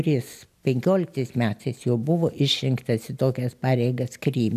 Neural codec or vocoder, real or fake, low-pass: none; real; 14.4 kHz